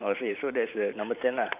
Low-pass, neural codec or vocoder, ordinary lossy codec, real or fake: 3.6 kHz; vocoder, 44.1 kHz, 128 mel bands every 512 samples, BigVGAN v2; none; fake